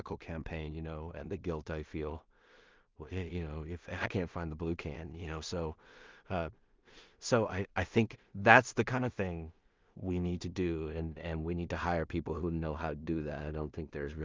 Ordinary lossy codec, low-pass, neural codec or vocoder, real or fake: Opus, 32 kbps; 7.2 kHz; codec, 16 kHz in and 24 kHz out, 0.4 kbps, LongCat-Audio-Codec, two codebook decoder; fake